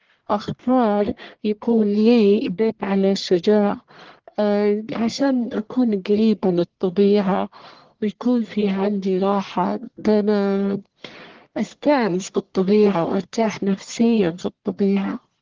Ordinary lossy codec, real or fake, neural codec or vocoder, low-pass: Opus, 16 kbps; fake; codec, 44.1 kHz, 1.7 kbps, Pupu-Codec; 7.2 kHz